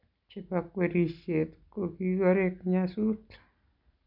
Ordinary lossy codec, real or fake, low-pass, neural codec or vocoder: none; real; 5.4 kHz; none